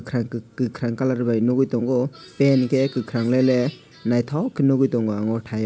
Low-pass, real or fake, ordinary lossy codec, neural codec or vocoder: none; real; none; none